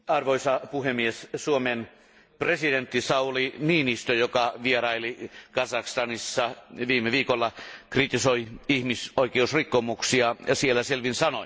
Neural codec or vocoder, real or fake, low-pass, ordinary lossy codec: none; real; none; none